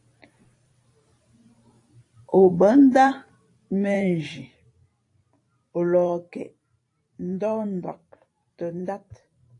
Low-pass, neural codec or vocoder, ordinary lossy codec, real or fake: 10.8 kHz; vocoder, 44.1 kHz, 128 mel bands every 512 samples, BigVGAN v2; AAC, 48 kbps; fake